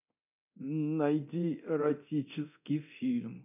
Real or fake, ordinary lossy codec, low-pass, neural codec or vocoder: fake; none; 3.6 kHz; codec, 24 kHz, 0.9 kbps, DualCodec